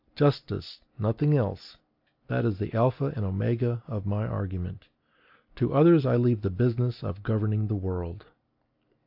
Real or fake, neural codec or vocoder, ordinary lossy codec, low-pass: real; none; AAC, 48 kbps; 5.4 kHz